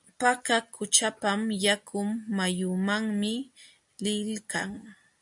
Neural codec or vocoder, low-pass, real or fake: none; 10.8 kHz; real